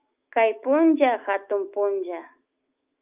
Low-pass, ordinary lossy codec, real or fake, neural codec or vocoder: 3.6 kHz; Opus, 24 kbps; fake; autoencoder, 48 kHz, 128 numbers a frame, DAC-VAE, trained on Japanese speech